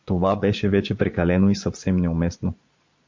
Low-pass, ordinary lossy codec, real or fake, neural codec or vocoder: 7.2 kHz; MP3, 48 kbps; fake; vocoder, 22.05 kHz, 80 mel bands, Vocos